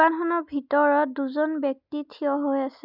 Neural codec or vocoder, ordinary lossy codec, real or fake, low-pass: none; none; real; 5.4 kHz